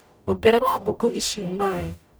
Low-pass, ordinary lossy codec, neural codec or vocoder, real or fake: none; none; codec, 44.1 kHz, 0.9 kbps, DAC; fake